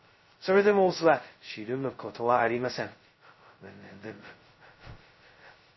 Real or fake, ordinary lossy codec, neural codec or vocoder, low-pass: fake; MP3, 24 kbps; codec, 16 kHz, 0.2 kbps, FocalCodec; 7.2 kHz